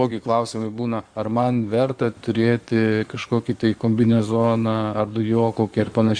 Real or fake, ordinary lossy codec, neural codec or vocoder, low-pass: fake; Opus, 64 kbps; codec, 16 kHz in and 24 kHz out, 2.2 kbps, FireRedTTS-2 codec; 9.9 kHz